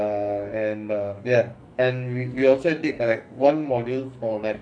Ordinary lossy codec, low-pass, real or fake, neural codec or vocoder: none; 9.9 kHz; fake; codec, 44.1 kHz, 2.6 kbps, SNAC